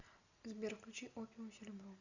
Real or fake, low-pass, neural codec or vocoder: real; 7.2 kHz; none